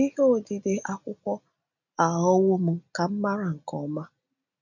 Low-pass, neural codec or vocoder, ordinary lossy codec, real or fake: 7.2 kHz; none; none; real